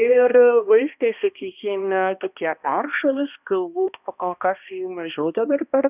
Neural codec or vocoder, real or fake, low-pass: codec, 16 kHz, 1 kbps, X-Codec, HuBERT features, trained on balanced general audio; fake; 3.6 kHz